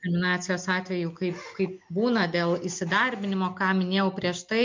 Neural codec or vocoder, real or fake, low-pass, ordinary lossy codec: none; real; 7.2 kHz; MP3, 48 kbps